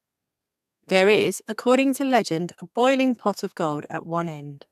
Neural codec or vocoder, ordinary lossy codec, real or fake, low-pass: codec, 32 kHz, 1.9 kbps, SNAC; none; fake; 14.4 kHz